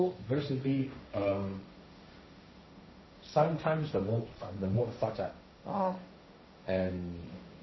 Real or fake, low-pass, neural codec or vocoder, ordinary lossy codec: fake; 7.2 kHz; codec, 16 kHz, 1.1 kbps, Voila-Tokenizer; MP3, 24 kbps